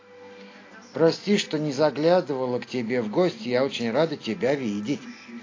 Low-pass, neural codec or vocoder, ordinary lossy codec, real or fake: 7.2 kHz; none; AAC, 32 kbps; real